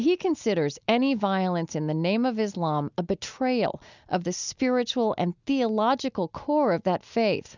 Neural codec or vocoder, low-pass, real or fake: none; 7.2 kHz; real